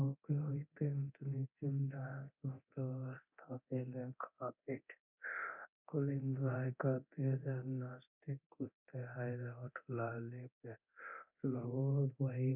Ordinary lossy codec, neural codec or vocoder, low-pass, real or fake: none; codec, 24 kHz, 0.9 kbps, DualCodec; 3.6 kHz; fake